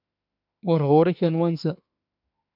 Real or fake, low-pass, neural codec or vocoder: fake; 5.4 kHz; autoencoder, 48 kHz, 32 numbers a frame, DAC-VAE, trained on Japanese speech